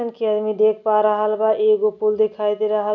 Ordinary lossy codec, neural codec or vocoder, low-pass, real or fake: none; none; 7.2 kHz; real